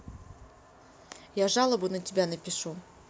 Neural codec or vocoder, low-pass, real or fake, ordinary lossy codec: none; none; real; none